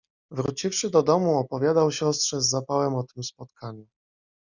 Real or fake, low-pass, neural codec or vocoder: real; 7.2 kHz; none